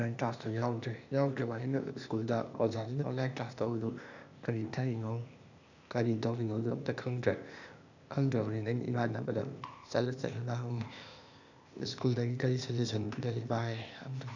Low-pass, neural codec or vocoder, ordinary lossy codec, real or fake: 7.2 kHz; codec, 16 kHz, 0.8 kbps, ZipCodec; none; fake